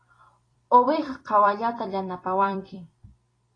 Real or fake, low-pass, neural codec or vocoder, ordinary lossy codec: fake; 9.9 kHz; vocoder, 44.1 kHz, 128 mel bands every 256 samples, BigVGAN v2; AAC, 32 kbps